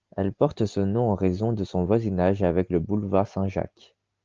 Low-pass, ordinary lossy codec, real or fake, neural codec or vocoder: 7.2 kHz; Opus, 24 kbps; real; none